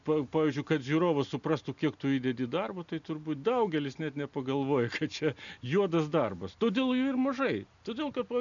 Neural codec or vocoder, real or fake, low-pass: none; real; 7.2 kHz